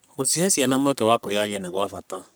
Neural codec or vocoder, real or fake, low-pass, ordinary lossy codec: codec, 44.1 kHz, 3.4 kbps, Pupu-Codec; fake; none; none